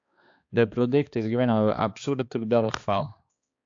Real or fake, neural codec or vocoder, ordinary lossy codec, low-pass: fake; codec, 16 kHz, 2 kbps, X-Codec, HuBERT features, trained on balanced general audio; AAC, 48 kbps; 7.2 kHz